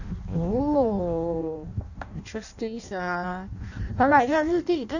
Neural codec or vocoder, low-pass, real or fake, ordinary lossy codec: codec, 16 kHz in and 24 kHz out, 0.6 kbps, FireRedTTS-2 codec; 7.2 kHz; fake; none